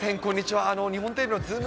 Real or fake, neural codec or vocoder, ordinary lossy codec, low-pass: real; none; none; none